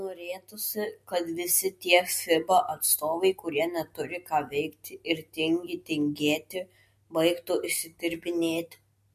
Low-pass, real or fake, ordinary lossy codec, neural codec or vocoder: 14.4 kHz; real; MP3, 64 kbps; none